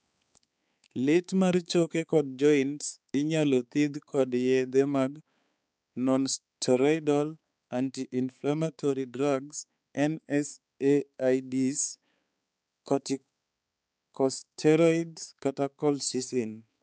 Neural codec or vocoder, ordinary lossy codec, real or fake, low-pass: codec, 16 kHz, 4 kbps, X-Codec, HuBERT features, trained on balanced general audio; none; fake; none